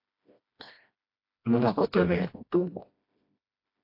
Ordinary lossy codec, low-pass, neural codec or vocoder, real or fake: AAC, 32 kbps; 5.4 kHz; codec, 16 kHz, 1 kbps, FreqCodec, smaller model; fake